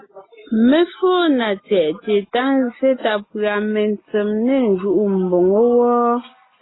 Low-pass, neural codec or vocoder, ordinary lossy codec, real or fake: 7.2 kHz; none; AAC, 16 kbps; real